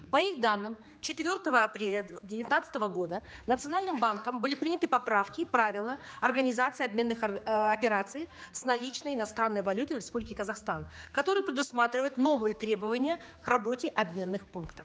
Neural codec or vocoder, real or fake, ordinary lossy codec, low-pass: codec, 16 kHz, 2 kbps, X-Codec, HuBERT features, trained on general audio; fake; none; none